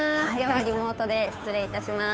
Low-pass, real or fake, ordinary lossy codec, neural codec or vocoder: none; fake; none; codec, 16 kHz, 2 kbps, FunCodec, trained on Chinese and English, 25 frames a second